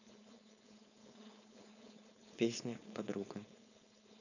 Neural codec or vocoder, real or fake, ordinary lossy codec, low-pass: codec, 16 kHz, 4.8 kbps, FACodec; fake; none; 7.2 kHz